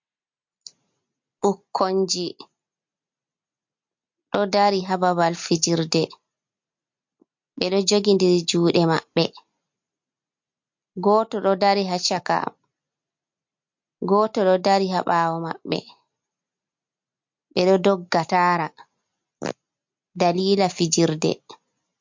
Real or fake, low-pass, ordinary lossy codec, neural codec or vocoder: real; 7.2 kHz; MP3, 48 kbps; none